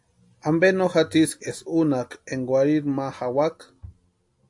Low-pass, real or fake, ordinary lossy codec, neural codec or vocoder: 10.8 kHz; real; AAC, 64 kbps; none